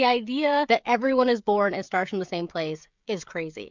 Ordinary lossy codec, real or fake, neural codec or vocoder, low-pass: MP3, 64 kbps; fake; codec, 16 kHz, 16 kbps, FreqCodec, smaller model; 7.2 kHz